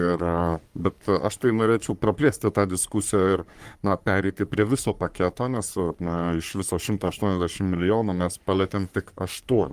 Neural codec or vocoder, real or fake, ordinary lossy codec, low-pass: codec, 44.1 kHz, 3.4 kbps, Pupu-Codec; fake; Opus, 32 kbps; 14.4 kHz